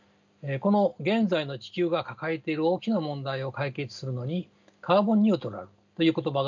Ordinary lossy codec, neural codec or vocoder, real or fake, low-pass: none; none; real; 7.2 kHz